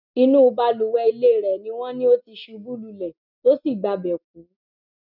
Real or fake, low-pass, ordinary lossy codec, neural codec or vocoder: real; 5.4 kHz; none; none